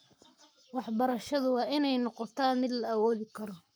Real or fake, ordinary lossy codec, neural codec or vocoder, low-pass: fake; none; codec, 44.1 kHz, 7.8 kbps, Pupu-Codec; none